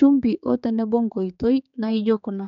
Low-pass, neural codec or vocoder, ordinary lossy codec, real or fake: 7.2 kHz; codec, 16 kHz, 4 kbps, X-Codec, HuBERT features, trained on general audio; none; fake